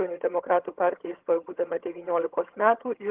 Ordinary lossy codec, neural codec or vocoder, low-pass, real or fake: Opus, 16 kbps; vocoder, 22.05 kHz, 80 mel bands, HiFi-GAN; 3.6 kHz; fake